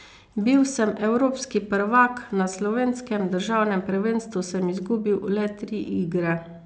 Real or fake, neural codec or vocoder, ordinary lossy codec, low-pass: real; none; none; none